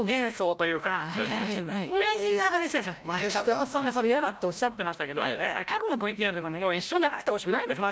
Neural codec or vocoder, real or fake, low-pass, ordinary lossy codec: codec, 16 kHz, 0.5 kbps, FreqCodec, larger model; fake; none; none